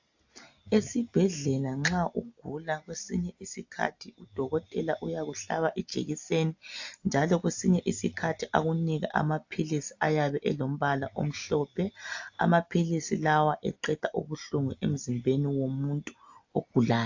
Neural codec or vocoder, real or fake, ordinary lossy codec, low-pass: none; real; AAC, 48 kbps; 7.2 kHz